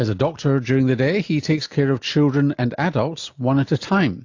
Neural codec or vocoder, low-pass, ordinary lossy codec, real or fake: none; 7.2 kHz; AAC, 48 kbps; real